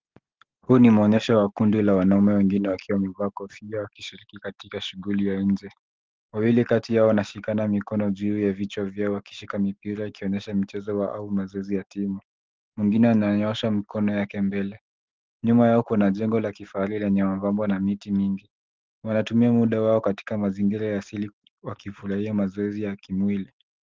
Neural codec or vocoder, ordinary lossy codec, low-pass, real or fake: none; Opus, 16 kbps; 7.2 kHz; real